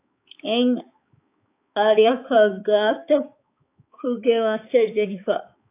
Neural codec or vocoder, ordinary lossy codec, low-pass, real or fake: codec, 16 kHz, 4 kbps, X-Codec, HuBERT features, trained on balanced general audio; AAC, 24 kbps; 3.6 kHz; fake